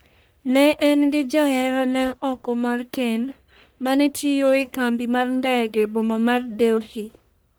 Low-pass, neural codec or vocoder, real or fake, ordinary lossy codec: none; codec, 44.1 kHz, 1.7 kbps, Pupu-Codec; fake; none